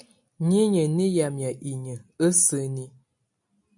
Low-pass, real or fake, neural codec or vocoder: 10.8 kHz; real; none